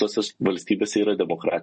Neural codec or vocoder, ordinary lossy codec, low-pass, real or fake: none; MP3, 32 kbps; 9.9 kHz; real